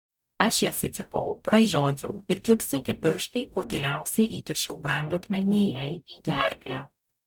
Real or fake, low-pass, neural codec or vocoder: fake; 19.8 kHz; codec, 44.1 kHz, 0.9 kbps, DAC